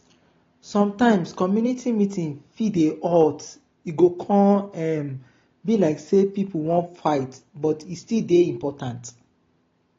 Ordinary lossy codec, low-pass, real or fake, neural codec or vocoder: AAC, 32 kbps; 7.2 kHz; real; none